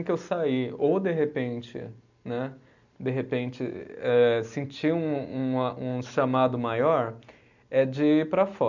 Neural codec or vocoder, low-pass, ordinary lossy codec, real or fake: none; 7.2 kHz; none; real